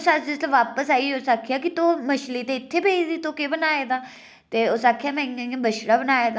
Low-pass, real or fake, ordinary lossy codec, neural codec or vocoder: none; real; none; none